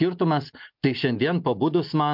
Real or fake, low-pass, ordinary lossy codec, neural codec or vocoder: real; 5.4 kHz; MP3, 48 kbps; none